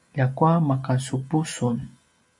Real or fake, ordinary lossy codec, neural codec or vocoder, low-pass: real; MP3, 96 kbps; none; 10.8 kHz